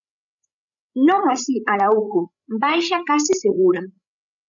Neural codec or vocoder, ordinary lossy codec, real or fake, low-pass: codec, 16 kHz, 16 kbps, FreqCodec, larger model; MP3, 64 kbps; fake; 7.2 kHz